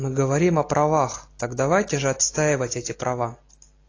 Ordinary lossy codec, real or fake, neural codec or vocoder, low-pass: AAC, 32 kbps; real; none; 7.2 kHz